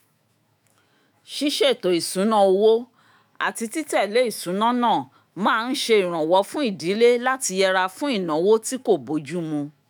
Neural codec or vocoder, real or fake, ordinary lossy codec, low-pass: autoencoder, 48 kHz, 128 numbers a frame, DAC-VAE, trained on Japanese speech; fake; none; none